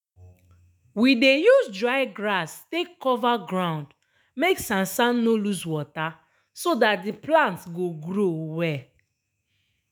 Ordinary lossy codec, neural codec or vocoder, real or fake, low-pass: none; autoencoder, 48 kHz, 128 numbers a frame, DAC-VAE, trained on Japanese speech; fake; none